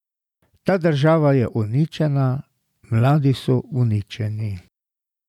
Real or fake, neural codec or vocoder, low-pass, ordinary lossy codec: real; none; 19.8 kHz; none